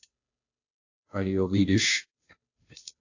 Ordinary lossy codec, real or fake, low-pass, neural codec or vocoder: AAC, 48 kbps; fake; 7.2 kHz; codec, 16 kHz, 0.5 kbps, FunCodec, trained on Chinese and English, 25 frames a second